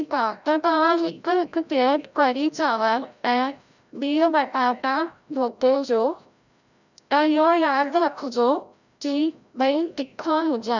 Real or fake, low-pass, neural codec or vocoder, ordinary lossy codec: fake; 7.2 kHz; codec, 16 kHz, 0.5 kbps, FreqCodec, larger model; none